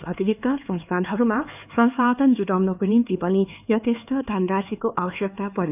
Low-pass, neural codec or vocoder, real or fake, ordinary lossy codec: 3.6 kHz; codec, 16 kHz, 4 kbps, X-Codec, HuBERT features, trained on LibriSpeech; fake; none